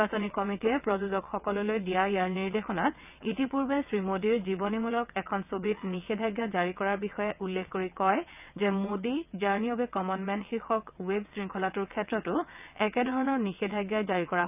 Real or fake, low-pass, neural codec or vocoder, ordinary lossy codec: fake; 3.6 kHz; vocoder, 22.05 kHz, 80 mel bands, WaveNeXt; none